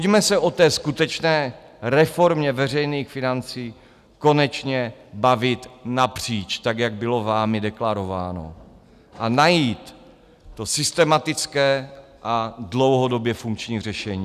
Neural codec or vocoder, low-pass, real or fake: none; 14.4 kHz; real